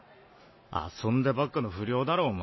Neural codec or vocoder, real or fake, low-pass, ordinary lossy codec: none; real; 7.2 kHz; MP3, 24 kbps